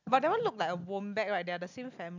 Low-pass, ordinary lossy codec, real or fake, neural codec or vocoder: 7.2 kHz; none; real; none